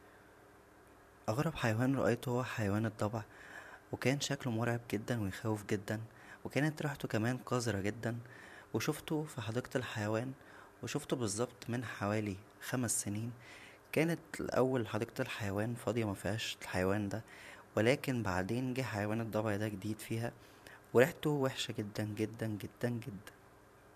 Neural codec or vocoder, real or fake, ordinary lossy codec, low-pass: vocoder, 44.1 kHz, 128 mel bands every 512 samples, BigVGAN v2; fake; none; 14.4 kHz